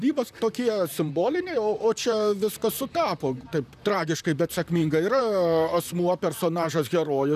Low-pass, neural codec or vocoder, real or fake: 14.4 kHz; vocoder, 44.1 kHz, 128 mel bands, Pupu-Vocoder; fake